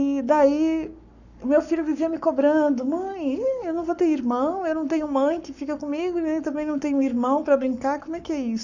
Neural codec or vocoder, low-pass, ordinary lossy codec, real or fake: codec, 44.1 kHz, 7.8 kbps, Pupu-Codec; 7.2 kHz; none; fake